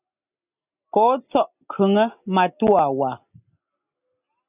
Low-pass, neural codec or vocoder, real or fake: 3.6 kHz; none; real